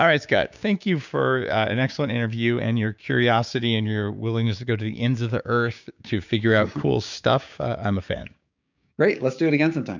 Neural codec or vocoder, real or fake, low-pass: codec, 16 kHz, 6 kbps, DAC; fake; 7.2 kHz